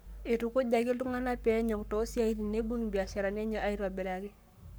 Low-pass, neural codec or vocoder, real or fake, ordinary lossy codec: none; codec, 44.1 kHz, 7.8 kbps, Pupu-Codec; fake; none